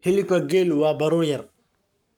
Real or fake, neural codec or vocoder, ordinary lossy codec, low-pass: fake; codec, 44.1 kHz, 7.8 kbps, Pupu-Codec; none; 19.8 kHz